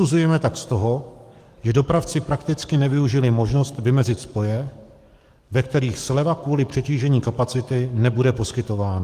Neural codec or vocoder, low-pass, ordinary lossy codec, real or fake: codec, 44.1 kHz, 7.8 kbps, DAC; 14.4 kHz; Opus, 24 kbps; fake